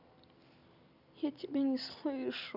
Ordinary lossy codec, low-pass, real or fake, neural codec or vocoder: none; 5.4 kHz; real; none